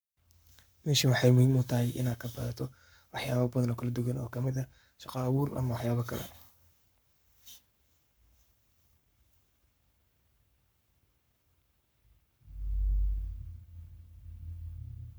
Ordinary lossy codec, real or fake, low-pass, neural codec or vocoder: none; fake; none; codec, 44.1 kHz, 7.8 kbps, Pupu-Codec